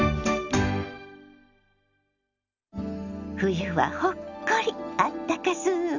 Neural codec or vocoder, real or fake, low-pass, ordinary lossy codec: none; real; 7.2 kHz; none